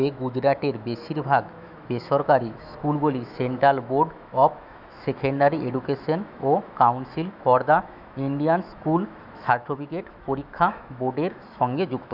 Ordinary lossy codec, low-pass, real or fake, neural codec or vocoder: none; 5.4 kHz; fake; autoencoder, 48 kHz, 128 numbers a frame, DAC-VAE, trained on Japanese speech